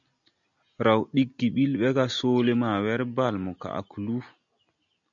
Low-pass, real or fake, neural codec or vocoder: 7.2 kHz; real; none